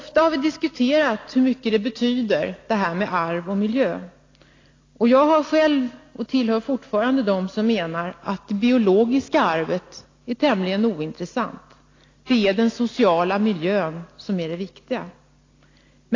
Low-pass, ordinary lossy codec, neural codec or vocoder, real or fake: 7.2 kHz; AAC, 32 kbps; none; real